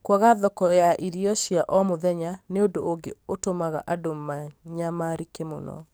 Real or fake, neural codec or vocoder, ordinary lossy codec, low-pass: fake; codec, 44.1 kHz, 7.8 kbps, DAC; none; none